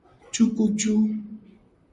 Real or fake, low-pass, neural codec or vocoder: fake; 10.8 kHz; vocoder, 44.1 kHz, 128 mel bands, Pupu-Vocoder